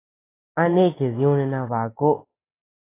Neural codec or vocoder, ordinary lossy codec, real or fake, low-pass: none; AAC, 16 kbps; real; 3.6 kHz